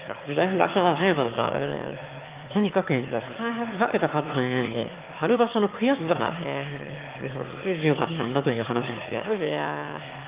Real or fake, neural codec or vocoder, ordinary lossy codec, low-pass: fake; autoencoder, 22.05 kHz, a latent of 192 numbers a frame, VITS, trained on one speaker; Opus, 32 kbps; 3.6 kHz